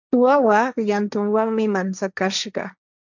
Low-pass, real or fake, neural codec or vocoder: 7.2 kHz; fake; codec, 16 kHz, 1.1 kbps, Voila-Tokenizer